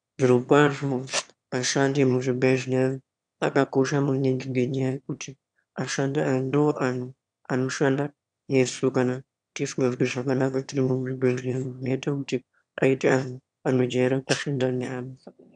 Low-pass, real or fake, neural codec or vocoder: 9.9 kHz; fake; autoencoder, 22.05 kHz, a latent of 192 numbers a frame, VITS, trained on one speaker